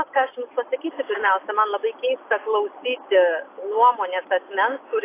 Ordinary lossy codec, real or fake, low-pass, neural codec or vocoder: AAC, 24 kbps; real; 3.6 kHz; none